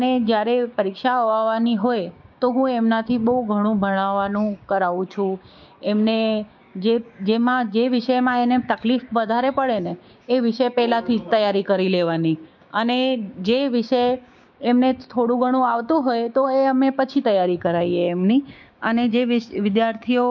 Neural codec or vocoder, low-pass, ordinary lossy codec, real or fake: codec, 16 kHz, 6 kbps, DAC; 7.2 kHz; MP3, 48 kbps; fake